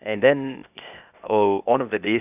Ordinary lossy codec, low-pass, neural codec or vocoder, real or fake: none; 3.6 kHz; codec, 16 kHz, 0.8 kbps, ZipCodec; fake